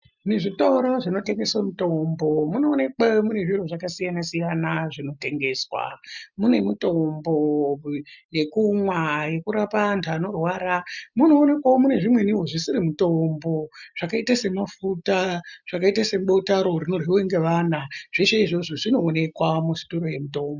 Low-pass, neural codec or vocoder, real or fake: 7.2 kHz; none; real